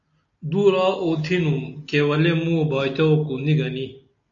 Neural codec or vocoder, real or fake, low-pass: none; real; 7.2 kHz